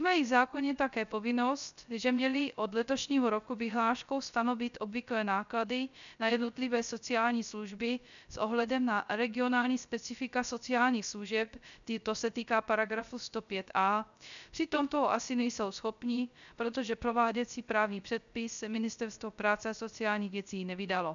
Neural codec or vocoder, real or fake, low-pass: codec, 16 kHz, 0.3 kbps, FocalCodec; fake; 7.2 kHz